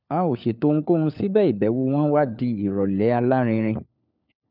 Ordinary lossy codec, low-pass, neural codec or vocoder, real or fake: none; 5.4 kHz; codec, 16 kHz, 4 kbps, FunCodec, trained on LibriTTS, 50 frames a second; fake